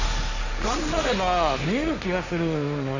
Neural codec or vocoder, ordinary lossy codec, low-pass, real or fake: codec, 16 kHz, 1.1 kbps, Voila-Tokenizer; Opus, 64 kbps; 7.2 kHz; fake